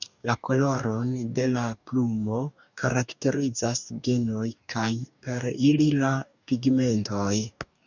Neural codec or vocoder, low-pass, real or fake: codec, 44.1 kHz, 2.6 kbps, DAC; 7.2 kHz; fake